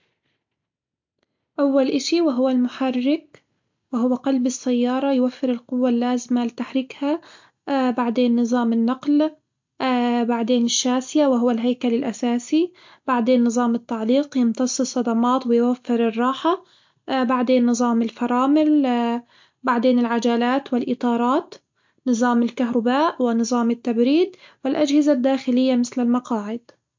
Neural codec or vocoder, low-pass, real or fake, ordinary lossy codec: none; 7.2 kHz; real; MP3, 48 kbps